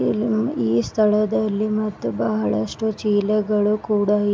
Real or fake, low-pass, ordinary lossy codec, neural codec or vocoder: real; none; none; none